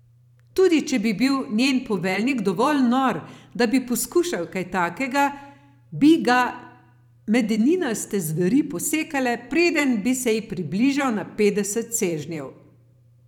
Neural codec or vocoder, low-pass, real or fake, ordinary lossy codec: vocoder, 44.1 kHz, 128 mel bands every 512 samples, BigVGAN v2; 19.8 kHz; fake; none